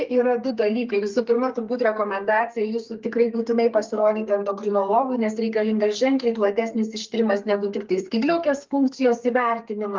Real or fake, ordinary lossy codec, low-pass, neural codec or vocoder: fake; Opus, 32 kbps; 7.2 kHz; codec, 32 kHz, 1.9 kbps, SNAC